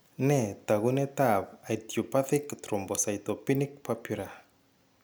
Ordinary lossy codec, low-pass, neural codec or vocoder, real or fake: none; none; none; real